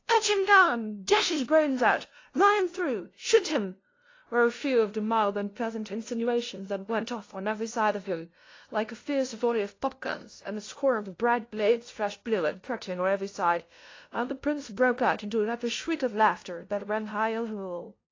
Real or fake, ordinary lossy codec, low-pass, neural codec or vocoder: fake; AAC, 32 kbps; 7.2 kHz; codec, 16 kHz, 0.5 kbps, FunCodec, trained on LibriTTS, 25 frames a second